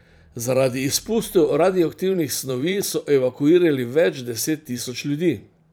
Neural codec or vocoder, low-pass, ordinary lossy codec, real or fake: vocoder, 44.1 kHz, 128 mel bands every 512 samples, BigVGAN v2; none; none; fake